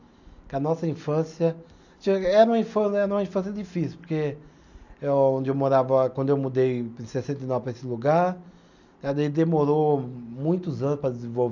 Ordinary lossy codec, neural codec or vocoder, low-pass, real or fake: none; none; 7.2 kHz; real